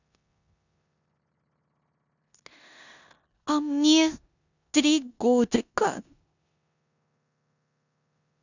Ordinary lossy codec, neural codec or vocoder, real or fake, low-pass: none; codec, 16 kHz in and 24 kHz out, 0.9 kbps, LongCat-Audio-Codec, four codebook decoder; fake; 7.2 kHz